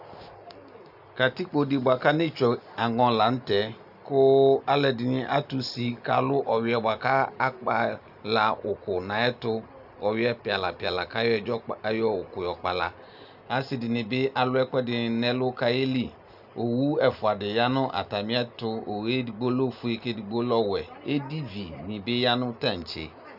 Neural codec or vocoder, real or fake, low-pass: none; real; 5.4 kHz